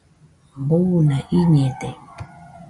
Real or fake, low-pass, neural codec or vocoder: real; 10.8 kHz; none